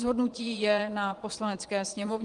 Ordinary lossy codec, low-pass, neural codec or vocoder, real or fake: Opus, 24 kbps; 9.9 kHz; vocoder, 22.05 kHz, 80 mel bands, Vocos; fake